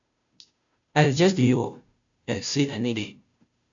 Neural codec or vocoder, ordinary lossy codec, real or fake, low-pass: codec, 16 kHz, 0.5 kbps, FunCodec, trained on Chinese and English, 25 frames a second; AAC, 64 kbps; fake; 7.2 kHz